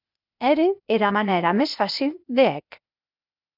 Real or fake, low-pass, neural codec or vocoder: fake; 5.4 kHz; codec, 16 kHz, 0.8 kbps, ZipCodec